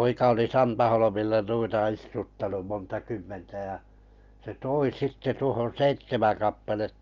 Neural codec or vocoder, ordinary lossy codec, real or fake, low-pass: none; Opus, 24 kbps; real; 7.2 kHz